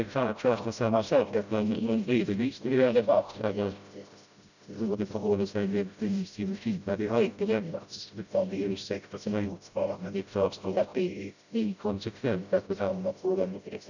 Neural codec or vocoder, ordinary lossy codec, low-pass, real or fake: codec, 16 kHz, 0.5 kbps, FreqCodec, smaller model; none; 7.2 kHz; fake